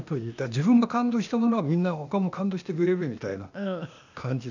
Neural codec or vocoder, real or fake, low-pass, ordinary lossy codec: codec, 16 kHz, 0.8 kbps, ZipCodec; fake; 7.2 kHz; none